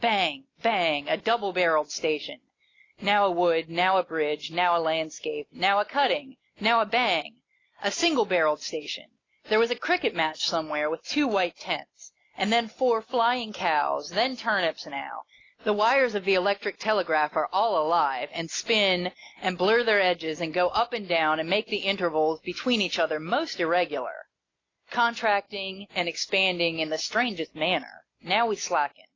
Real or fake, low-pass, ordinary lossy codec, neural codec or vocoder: real; 7.2 kHz; AAC, 32 kbps; none